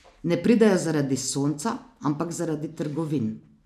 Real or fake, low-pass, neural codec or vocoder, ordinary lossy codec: real; 14.4 kHz; none; none